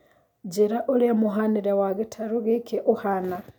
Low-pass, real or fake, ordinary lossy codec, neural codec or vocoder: 19.8 kHz; real; none; none